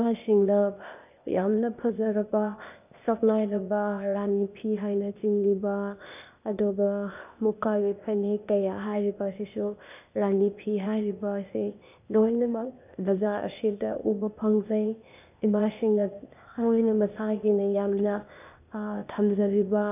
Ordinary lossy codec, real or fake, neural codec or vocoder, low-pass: none; fake; codec, 16 kHz, 0.8 kbps, ZipCodec; 3.6 kHz